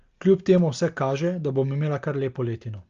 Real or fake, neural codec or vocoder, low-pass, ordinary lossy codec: real; none; 7.2 kHz; Opus, 32 kbps